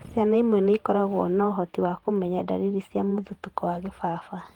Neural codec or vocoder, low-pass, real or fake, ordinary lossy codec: vocoder, 48 kHz, 128 mel bands, Vocos; 19.8 kHz; fake; Opus, 24 kbps